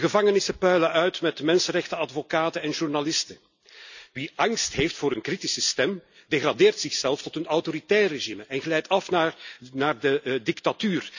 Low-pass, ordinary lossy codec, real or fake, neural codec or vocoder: 7.2 kHz; none; real; none